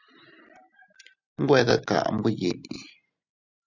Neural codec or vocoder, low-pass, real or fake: none; 7.2 kHz; real